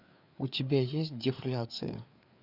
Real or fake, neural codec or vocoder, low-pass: fake; codec, 16 kHz, 4 kbps, FreqCodec, larger model; 5.4 kHz